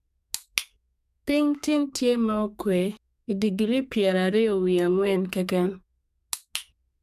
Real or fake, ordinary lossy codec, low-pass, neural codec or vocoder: fake; none; 14.4 kHz; codec, 32 kHz, 1.9 kbps, SNAC